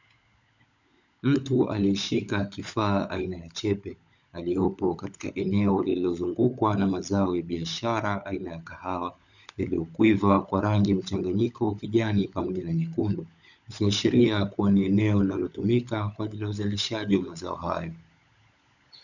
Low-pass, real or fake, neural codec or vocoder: 7.2 kHz; fake; codec, 16 kHz, 16 kbps, FunCodec, trained on LibriTTS, 50 frames a second